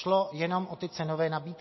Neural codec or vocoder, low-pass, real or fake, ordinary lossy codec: none; 7.2 kHz; real; MP3, 24 kbps